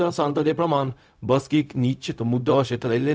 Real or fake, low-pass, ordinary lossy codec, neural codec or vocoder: fake; none; none; codec, 16 kHz, 0.4 kbps, LongCat-Audio-Codec